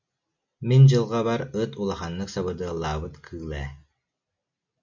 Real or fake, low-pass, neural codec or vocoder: real; 7.2 kHz; none